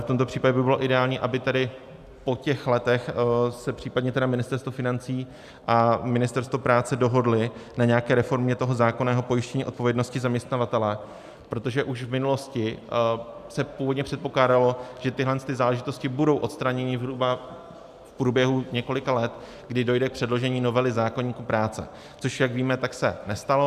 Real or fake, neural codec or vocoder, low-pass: real; none; 14.4 kHz